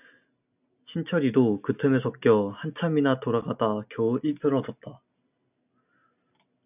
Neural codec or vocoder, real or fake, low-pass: none; real; 3.6 kHz